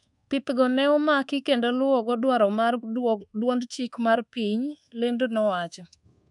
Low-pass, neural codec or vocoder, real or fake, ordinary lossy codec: 10.8 kHz; codec, 24 kHz, 1.2 kbps, DualCodec; fake; none